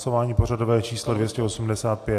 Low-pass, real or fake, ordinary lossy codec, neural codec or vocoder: 14.4 kHz; real; AAC, 64 kbps; none